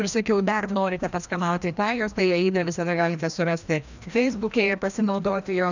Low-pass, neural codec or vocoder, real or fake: 7.2 kHz; codec, 16 kHz, 1 kbps, FreqCodec, larger model; fake